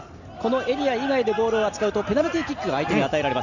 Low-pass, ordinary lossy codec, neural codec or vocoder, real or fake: 7.2 kHz; none; none; real